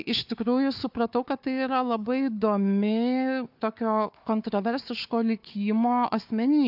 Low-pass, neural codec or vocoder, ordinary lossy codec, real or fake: 5.4 kHz; codec, 16 kHz, 4 kbps, FunCodec, trained on Chinese and English, 50 frames a second; AAC, 48 kbps; fake